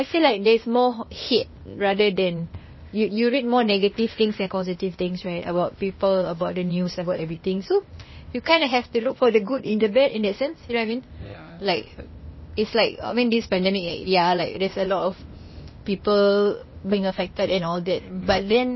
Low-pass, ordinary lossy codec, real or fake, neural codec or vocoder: 7.2 kHz; MP3, 24 kbps; fake; codec, 16 kHz, 0.8 kbps, ZipCodec